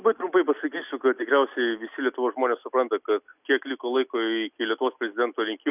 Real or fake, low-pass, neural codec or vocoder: real; 3.6 kHz; none